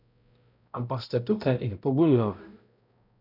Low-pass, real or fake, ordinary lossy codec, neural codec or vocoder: 5.4 kHz; fake; none; codec, 16 kHz, 0.5 kbps, X-Codec, HuBERT features, trained on balanced general audio